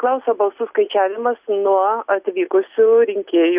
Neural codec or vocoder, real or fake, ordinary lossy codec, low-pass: none; real; Opus, 64 kbps; 3.6 kHz